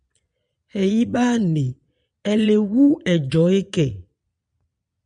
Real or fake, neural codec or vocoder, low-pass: fake; vocoder, 22.05 kHz, 80 mel bands, Vocos; 9.9 kHz